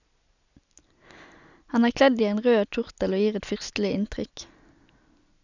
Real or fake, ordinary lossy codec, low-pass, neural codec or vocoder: real; none; 7.2 kHz; none